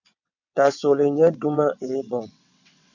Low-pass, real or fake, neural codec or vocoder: 7.2 kHz; fake; vocoder, 22.05 kHz, 80 mel bands, WaveNeXt